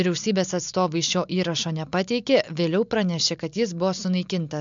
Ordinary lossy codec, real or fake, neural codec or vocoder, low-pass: MP3, 64 kbps; real; none; 7.2 kHz